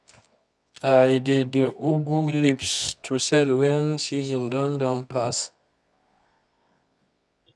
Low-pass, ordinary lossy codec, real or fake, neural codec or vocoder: none; none; fake; codec, 24 kHz, 0.9 kbps, WavTokenizer, medium music audio release